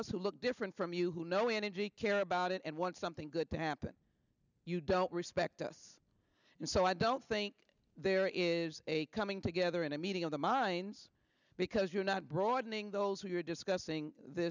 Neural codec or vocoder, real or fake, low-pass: none; real; 7.2 kHz